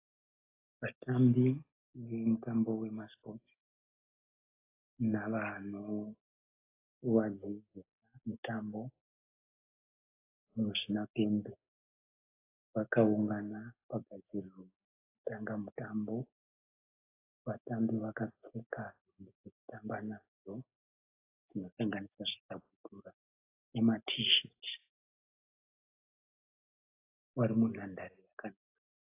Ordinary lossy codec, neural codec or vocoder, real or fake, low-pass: AAC, 32 kbps; none; real; 3.6 kHz